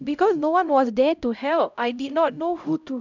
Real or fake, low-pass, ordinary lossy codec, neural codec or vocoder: fake; 7.2 kHz; none; codec, 16 kHz, 0.5 kbps, X-Codec, HuBERT features, trained on LibriSpeech